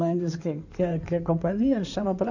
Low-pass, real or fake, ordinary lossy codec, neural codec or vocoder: 7.2 kHz; fake; none; codec, 16 kHz in and 24 kHz out, 2.2 kbps, FireRedTTS-2 codec